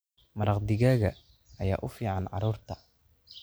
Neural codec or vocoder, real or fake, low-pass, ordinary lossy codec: none; real; none; none